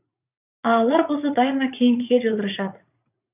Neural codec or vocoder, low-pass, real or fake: vocoder, 44.1 kHz, 80 mel bands, Vocos; 3.6 kHz; fake